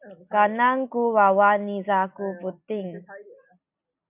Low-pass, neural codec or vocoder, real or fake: 3.6 kHz; none; real